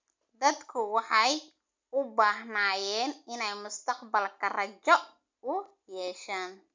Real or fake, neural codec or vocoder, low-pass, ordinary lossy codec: real; none; 7.2 kHz; MP3, 64 kbps